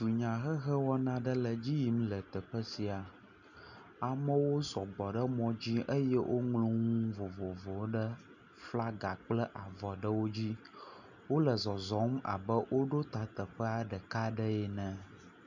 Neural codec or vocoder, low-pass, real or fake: none; 7.2 kHz; real